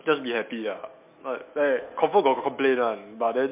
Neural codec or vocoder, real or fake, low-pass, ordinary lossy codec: autoencoder, 48 kHz, 128 numbers a frame, DAC-VAE, trained on Japanese speech; fake; 3.6 kHz; MP3, 32 kbps